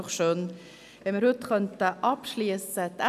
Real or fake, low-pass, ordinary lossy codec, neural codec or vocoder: real; 14.4 kHz; none; none